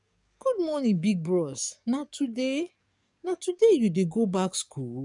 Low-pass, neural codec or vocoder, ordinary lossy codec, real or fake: 10.8 kHz; codec, 44.1 kHz, 7.8 kbps, DAC; none; fake